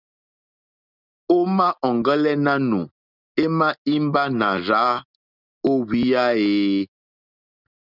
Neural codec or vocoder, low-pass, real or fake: none; 5.4 kHz; real